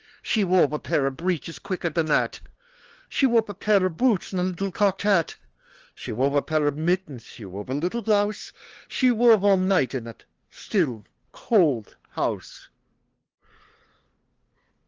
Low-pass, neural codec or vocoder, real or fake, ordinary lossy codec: 7.2 kHz; codec, 16 kHz, 2 kbps, FunCodec, trained on LibriTTS, 25 frames a second; fake; Opus, 16 kbps